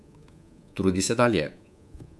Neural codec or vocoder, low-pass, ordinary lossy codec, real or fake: codec, 24 kHz, 3.1 kbps, DualCodec; none; none; fake